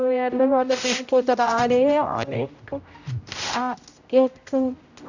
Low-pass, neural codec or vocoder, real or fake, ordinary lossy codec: 7.2 kHz; codec, 16 kHz, 0.5 kbps, X-Codec, HuBERT features, trained on general audio; fake; none